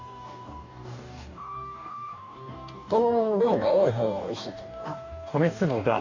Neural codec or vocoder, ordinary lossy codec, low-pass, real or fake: codec, 44.1 kHz, 2.6 kbps, DAC; none; 7.2 kHz; fake